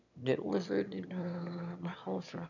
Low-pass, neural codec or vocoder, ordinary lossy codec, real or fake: 7.2 kHz; autoencoder, 22.05 kHz, a latent of 192 numbers a frame, VITS, trained on one speaker; none; fake